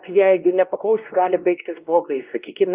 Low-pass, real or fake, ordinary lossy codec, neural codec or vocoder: 3.6 kHz; fake; Opus, 32 kbps; codec, 16 kHz, 1 kbps, X-Codec, WavLM features, trained on Multilingual LibriSpeech